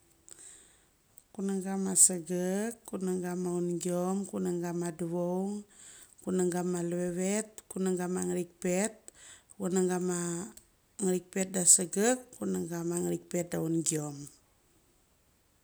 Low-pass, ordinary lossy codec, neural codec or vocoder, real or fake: none; none; none; real